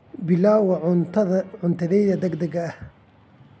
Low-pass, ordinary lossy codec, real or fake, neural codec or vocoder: none; none; real; none